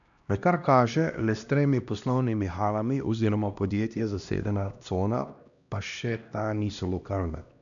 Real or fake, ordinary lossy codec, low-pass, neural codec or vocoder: fake; none; 7.2 kHz; codec, 16 kHz, 1 kbps, X-Codec, HuBERT features, trained on LibriSpeech